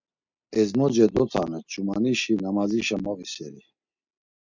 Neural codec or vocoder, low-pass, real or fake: none; 7.2 kHz; real